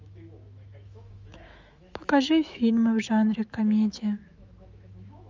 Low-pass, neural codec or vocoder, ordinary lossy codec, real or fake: 7.2 kHz; autoencoder, 48 kHz, 128 numbers a frame, DAC-VAE, trained on Japanese speech; Opus, 32 kbps; fake